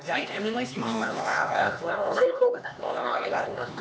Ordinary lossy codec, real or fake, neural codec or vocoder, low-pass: none; fake; codec, 16 kHz, 2 kbps, X-Codec, HuBERT features, trained on LibriSpeech; none